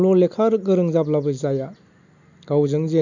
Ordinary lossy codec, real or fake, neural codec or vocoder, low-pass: none; real; none; 7.2 kHz